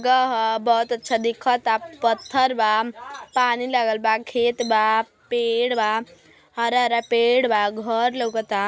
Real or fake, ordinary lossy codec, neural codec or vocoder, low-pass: real; none; none; none